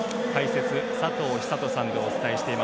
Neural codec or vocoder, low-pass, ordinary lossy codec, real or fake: none; none; none; real